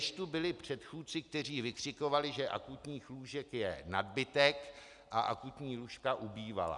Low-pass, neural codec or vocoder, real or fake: 10.8 kHz; none; real